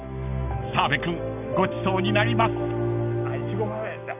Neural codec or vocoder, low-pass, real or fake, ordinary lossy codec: none; 3.6 kHz; real; none